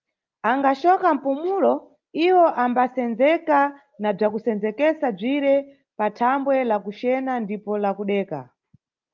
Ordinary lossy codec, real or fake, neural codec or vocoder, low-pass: Opus, 32 kbps; real; none; 7.2 kHz